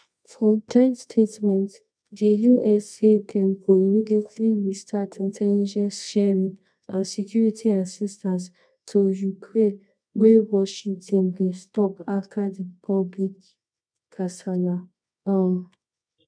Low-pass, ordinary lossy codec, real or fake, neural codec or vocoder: 9.9 kHz; none; fake; codec, 24 kHz, 0.9 kbps, WavTokenizer, medium music audio release